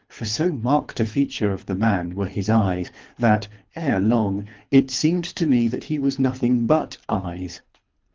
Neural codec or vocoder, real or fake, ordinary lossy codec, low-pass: codec, 24 kHz, 3 kbps, HILCodec; fake; Opus, 32 kbps; 7.2 kHz